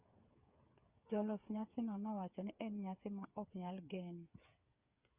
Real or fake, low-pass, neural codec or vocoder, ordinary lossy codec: fake; 3.6 kHz; codec, 16 kHz, 8 kbps, FreqCodec, smaller model; Opus, 64 kbps